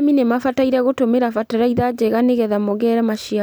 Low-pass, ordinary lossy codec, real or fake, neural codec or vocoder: none; none; real; none